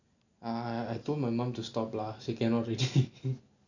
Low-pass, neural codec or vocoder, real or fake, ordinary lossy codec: 7.2 kHz; none; real; none